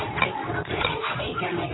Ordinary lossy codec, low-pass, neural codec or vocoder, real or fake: AAC, 16 kbps; 7.2 kHz; codec, 24 kHz, 0.9 kbps, WavTokenizer, medium speech release version 2; fake